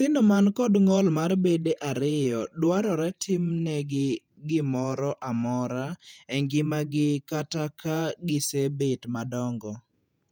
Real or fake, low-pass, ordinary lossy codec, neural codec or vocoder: fake; 19.8 kHz; none; vocoder, 48 kHz, 128 mel bands, Vocos